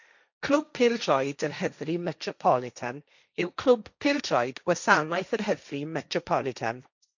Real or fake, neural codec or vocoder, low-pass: fake; codec, 16 kHz, 1.1 kbps, Voila-Tokenizer; 7.2 kHz